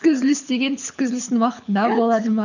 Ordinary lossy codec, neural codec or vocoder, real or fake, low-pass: none; codec, 24 kHz, 6 kbps, HILCodec; fake; 7.2 kHz